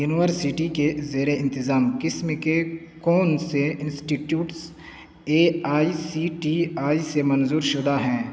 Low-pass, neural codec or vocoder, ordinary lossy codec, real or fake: none; none; none; real